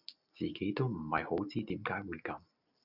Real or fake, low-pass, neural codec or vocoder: real; 5.4 kHz; none